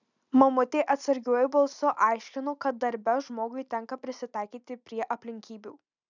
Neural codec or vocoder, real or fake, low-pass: none; real; 7.2 kHz